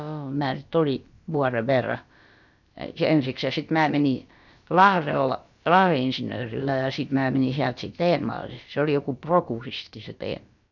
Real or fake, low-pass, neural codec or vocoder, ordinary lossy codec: fake; 7.2 kHz; codec, 16 kHz, about 1 kbps, DyCAST, with the encoder's durations; none